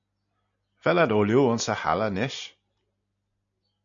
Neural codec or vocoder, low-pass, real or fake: none; 7.2 kHz; real